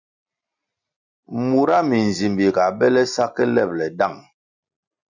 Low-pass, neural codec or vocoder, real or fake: 7.2 kHz; none; real